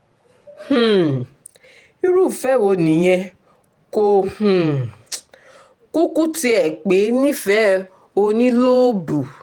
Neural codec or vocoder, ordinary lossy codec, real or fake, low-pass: vocoder, 48 kHz, 128 mel bands, Vocos; Opus, 24 kbps; fake; 19.8 kHz